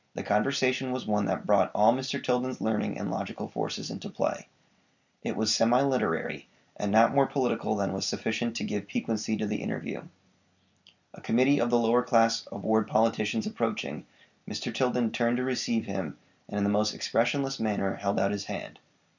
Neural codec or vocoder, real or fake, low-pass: none; real; 7.2 kHz